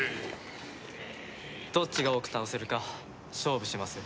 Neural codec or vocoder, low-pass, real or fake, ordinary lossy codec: none; none; real; none